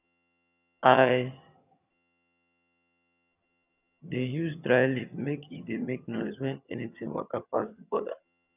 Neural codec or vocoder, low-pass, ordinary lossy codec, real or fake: vocoder, 22.05 kHz, 80 mel bands, HiFi-GAN; 3.6 kHz; none; fake